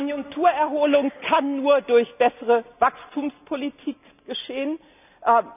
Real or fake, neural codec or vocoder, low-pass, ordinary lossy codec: real; none; 3.6 kHz; none